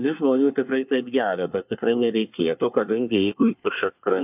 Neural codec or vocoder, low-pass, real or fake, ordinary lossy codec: codec, 24 kHz, 1 kbps, SNAC; 3.6 kHz; fake; AAC, 32 kbps